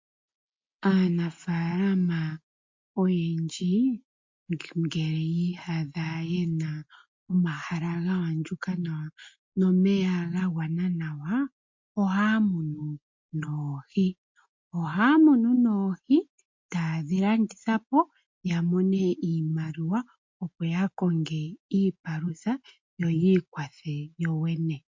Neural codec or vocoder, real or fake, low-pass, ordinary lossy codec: none; real; 7.2 kHz; MP3, 48 kbps